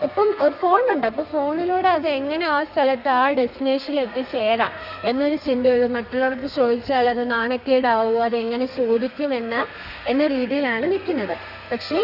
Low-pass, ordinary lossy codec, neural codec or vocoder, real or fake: 5.4 kHz; none; codec, 32 kHz, 1.9 kbps, SNAC; fake